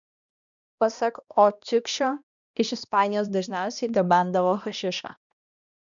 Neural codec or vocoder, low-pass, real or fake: codec, 16 kHz, 1 kbps, X-Codec, HuBERT features, trained on balanced general audio; 7.2 kHz; fake